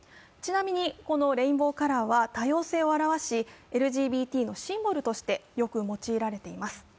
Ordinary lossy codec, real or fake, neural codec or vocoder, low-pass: none; real; none; none